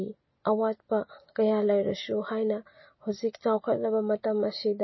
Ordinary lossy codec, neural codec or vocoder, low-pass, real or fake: MP3, 24 kbps; none; 7.2 kHz; real